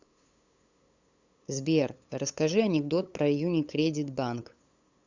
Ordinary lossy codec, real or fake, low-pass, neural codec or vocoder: Opus, 64 kbps; fake; 7.2 kHz; codec, 16 kHz, 8 kbps, FunCodec, trained on LibriTTS, 25 frames a second